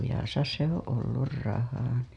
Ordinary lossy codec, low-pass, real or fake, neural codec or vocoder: none; none; real; none